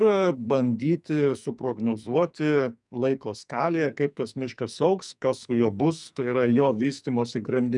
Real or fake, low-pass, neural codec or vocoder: fake; 10.8 kHz; codec, 44.1 kHz, 2.6 kbps, SNAC